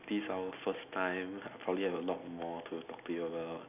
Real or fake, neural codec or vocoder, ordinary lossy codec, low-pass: real; none; none; 3.6 kHz